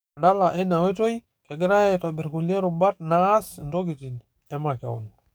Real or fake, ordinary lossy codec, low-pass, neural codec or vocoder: fake; none; none; codec, 44.1 kHz, 7.8 kbps, DAC